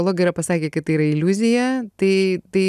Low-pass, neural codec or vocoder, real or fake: 14.4 kHz; none; real